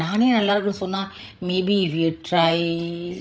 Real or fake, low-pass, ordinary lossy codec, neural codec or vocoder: fake; none; none; codec, 16 kHz, 8 kbps, FreqCodec, larger model